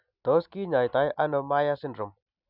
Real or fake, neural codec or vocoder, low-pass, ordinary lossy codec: real; none; 5.4 kHz; none